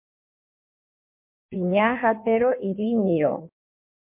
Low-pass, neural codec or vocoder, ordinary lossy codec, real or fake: 3.6 kHz; codec, 16 kHz in and 24 kHz out, 1.1 kbps, FireRedTTS-2 codec; MP3, 32 kbps; fake